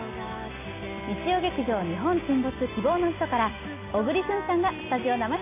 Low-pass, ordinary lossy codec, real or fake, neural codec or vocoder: 3.6 kHz; AAC, 24 kbps; real; none